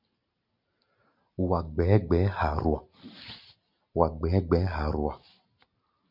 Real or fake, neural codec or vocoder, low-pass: real; none; 5.4 kHz